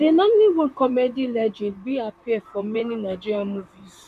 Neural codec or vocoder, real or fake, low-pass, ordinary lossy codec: vocoder, 44.1 kHz, 128 mel bands, Pupu-Vocoder; fake; 14.4 kHz; none